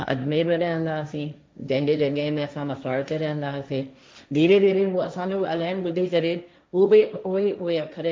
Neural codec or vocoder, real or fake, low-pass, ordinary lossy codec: codec, 16 kHz, 1.1 kbps, Voila-Tokenizer; fake; none; none